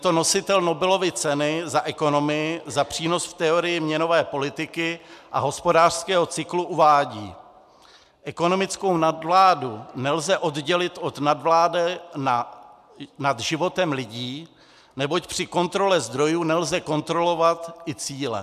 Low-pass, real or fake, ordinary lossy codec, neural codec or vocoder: 14.4 kHz; real; MP3, 96 kbps; none